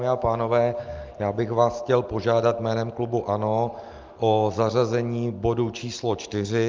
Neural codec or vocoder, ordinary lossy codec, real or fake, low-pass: none; Opus, 24 kbps; real; 7.2 kHz